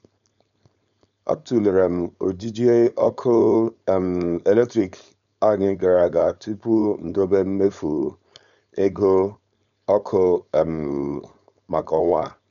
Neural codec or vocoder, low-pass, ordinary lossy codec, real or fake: codec, 16 kHz, 4.8 kbps, FACodec; 7.2 kHz; none; fake